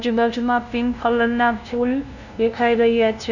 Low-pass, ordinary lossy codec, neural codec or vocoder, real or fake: 7.2 kHz; none; codec, 16 kHz, 0.5 kbps, FunCodec, trained on LibriTTS, 25 frames a second; fake